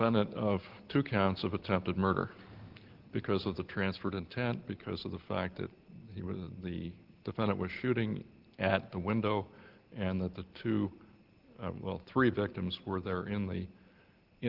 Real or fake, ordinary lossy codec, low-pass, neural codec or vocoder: real; Opus, 16 kbps; 5.4 kHz; none